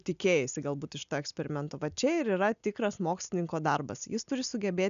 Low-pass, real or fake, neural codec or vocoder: 7.2 kHz; real; none